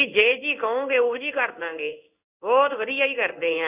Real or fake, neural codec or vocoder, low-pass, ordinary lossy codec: fake; codec, 16 kHz in and 24 kHz out, 1 kbps, XY-Tokenizer; 3.6 kHz; none